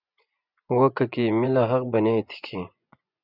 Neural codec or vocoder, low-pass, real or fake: vocoder, 24 kHz, 100 mel bands, Vocos; 5.4 kHz; fake